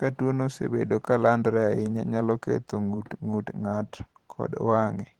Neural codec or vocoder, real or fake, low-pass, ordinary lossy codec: vocoder, 44.1 kHz, 128 mel bands every 512 samples, BigVGAN v2; fake; 19.8 kHz; Opus, 16 kbps